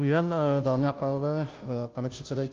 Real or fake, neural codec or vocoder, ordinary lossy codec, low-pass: fake; codec, 16 kHz, 0.5 kbps, FunCodec, trained on Chinese and English, 25 frames a second; Opus, 32 kbps; 7.2 kHz